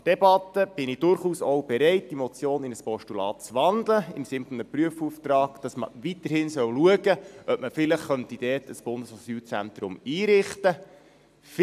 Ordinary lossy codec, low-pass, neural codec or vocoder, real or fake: AAC, 96 kbps; 14.4 kHz; none; real